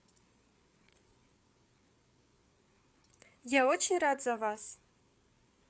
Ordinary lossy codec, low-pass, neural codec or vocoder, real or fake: none; none; codec, 16 kHz, 8 kbps, FreqCodec, larger model; fake